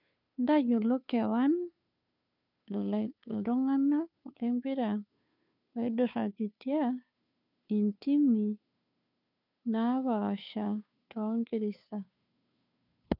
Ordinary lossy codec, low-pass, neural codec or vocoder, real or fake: none; 5.4 kHz; codec, 16 kHz, 2 kbps, FunCodec, trained on Chinese and English, 25 frames a second; fake